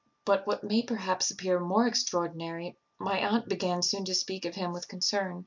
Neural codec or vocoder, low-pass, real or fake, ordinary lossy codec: none; 7.2 kHz; real; MP3, 64 kbps